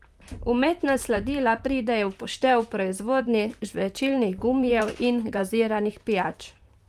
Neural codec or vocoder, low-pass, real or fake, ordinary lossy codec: vocoder, 44.1 kHz, 128 mel bands, Pupu-Vocoder; 14.4 kHz; fake; Opus, 32 kbps